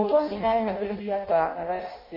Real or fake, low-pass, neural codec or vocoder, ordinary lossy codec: fake; 5.4 kHz; codec, 16 kHz in and 24 kHz out, 0.6 kbps, FireRedTTS-2 codec; MP3, 24 kbps